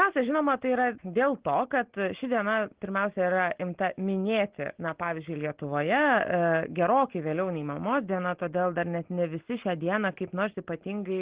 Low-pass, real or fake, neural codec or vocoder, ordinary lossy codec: 3.6 kHz; real; none; Opus, 16 kbps